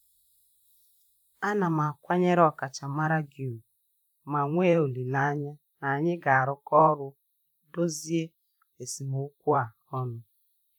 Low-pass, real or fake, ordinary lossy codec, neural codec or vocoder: 19.8 kHz; fake; none; vocoder, 44.1 kHz, 128 mel bands, Pupu-Vocoder